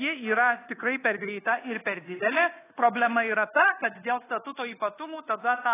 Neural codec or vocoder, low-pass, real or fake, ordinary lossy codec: codec, 24 kHz, 1.2 kbps, DualCodec; 3.6 kHz; fake; AAC, 16 kbps